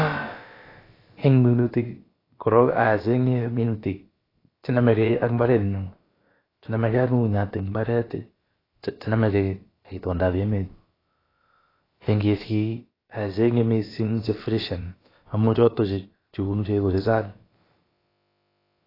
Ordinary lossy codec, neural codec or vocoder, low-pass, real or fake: AAC, 24 kbps; codec, 16 kHz, about 1 kbps, DyCAST, with the encoder's durations; 5.4 kHz; fake